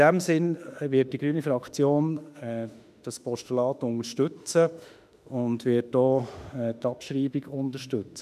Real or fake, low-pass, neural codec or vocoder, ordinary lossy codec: fake; 14.4 kHz; autoencoder, 48 kHz, 32 numbers a frame, DAC-VAE, trained on Japanese speech; none